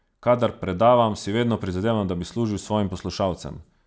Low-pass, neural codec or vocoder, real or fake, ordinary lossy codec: none; none; real; none